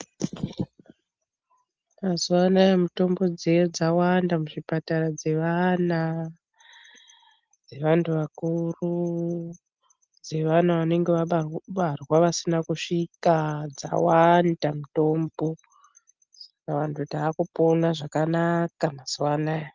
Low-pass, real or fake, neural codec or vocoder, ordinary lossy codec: 7.2 kHz; real; none; Opus, 24 kbps